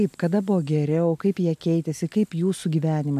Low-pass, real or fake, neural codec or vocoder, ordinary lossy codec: 14.4 kHz; real; none; MP3, 96 kbps